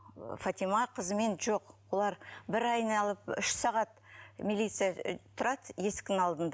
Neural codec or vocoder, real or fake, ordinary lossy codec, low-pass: none; real; none; none